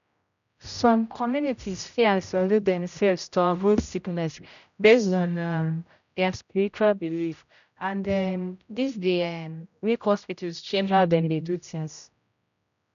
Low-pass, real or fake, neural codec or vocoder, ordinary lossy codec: 7.2 kHz; fake; codec, 16 kHz, 0.5 kbps, X-Codec, HuBERT features, trained on general audio; none